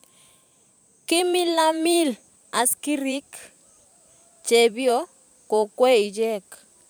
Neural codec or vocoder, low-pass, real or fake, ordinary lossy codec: vocoder, 44.1 kHz, 128 mel bands every 512 samples, BigVGAN v2; none; fake; none